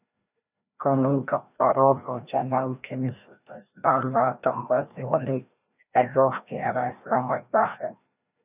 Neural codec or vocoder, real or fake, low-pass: codec, 16 kHz, 1 kbps, FreqCodec, larger model; fake; 3.6 kHz